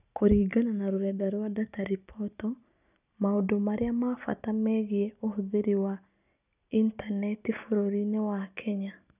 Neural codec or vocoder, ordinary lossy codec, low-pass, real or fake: none; none; 3.6 kHz; real